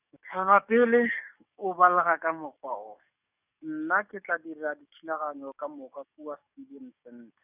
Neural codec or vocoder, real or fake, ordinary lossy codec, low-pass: codec, 44.1 kHz, 7.8 kbps, DAC; fake; none; 3.6 kHz